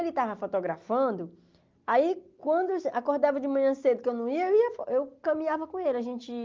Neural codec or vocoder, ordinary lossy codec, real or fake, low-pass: none; Opus, 32 kbps; real; 7.2 kHz